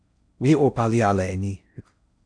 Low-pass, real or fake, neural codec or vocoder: 9.9 kHz; fake; codec, 16 kHz in and 24 kHz out, 0.6 kbps, FocalCodec, streaming, 4096 codes